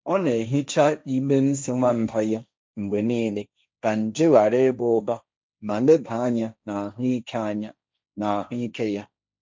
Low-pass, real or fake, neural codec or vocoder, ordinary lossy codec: none; fake; codec, 16 kHz, 1.1 kbps, Voila-Tokenizer; none